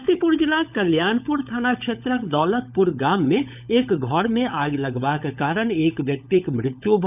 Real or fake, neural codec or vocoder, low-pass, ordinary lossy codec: fake; codec, 16 kHz, 16 kbps, FunCodec, trained on LibriTTS, 50 frames a second; 3.6 kHz; none